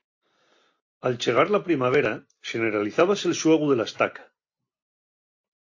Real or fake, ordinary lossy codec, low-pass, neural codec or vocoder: real; AAC, 48 kbps; 7.2 kHz; none